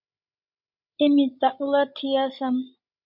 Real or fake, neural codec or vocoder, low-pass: fake; codec, 16 kHz, 16 kbps, FreqCodec, larger model; 5.4 kHz